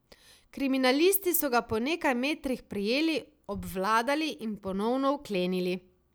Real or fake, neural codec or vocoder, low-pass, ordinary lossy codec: real; none; none; none